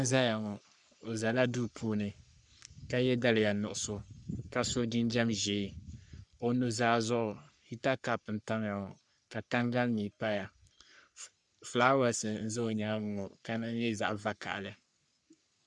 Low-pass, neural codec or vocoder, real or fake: 10.8 kHz; codec, 44.1 kHz, 3.4 kbps, Pupu-Codec; fake